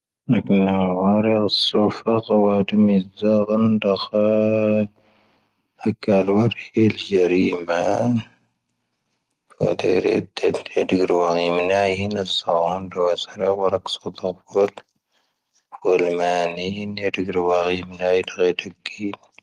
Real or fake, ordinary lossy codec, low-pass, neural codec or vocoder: real; Opus, 24 kbps; 14.4 kHz; none